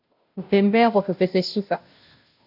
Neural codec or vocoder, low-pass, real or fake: codec, 16 kHz, 0.5 kbps, FunCodec, trained on Chinese and English, 25 frames a second; 5.4 kHz; fake